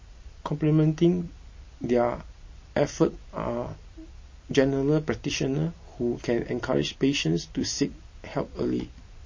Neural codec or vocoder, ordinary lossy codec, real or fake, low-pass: none; MP3, 32 kbps; real; 7.2 kHz